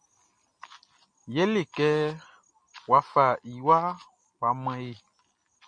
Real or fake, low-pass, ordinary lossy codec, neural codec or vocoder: real; 9.9 kHz; MP3, 48 kbps; none